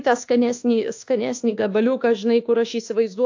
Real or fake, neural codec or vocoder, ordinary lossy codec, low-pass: fake; codec, 24 kHz, 1.2 kbps, DualCodec; AAC, 48 kbps; 7.2 kHz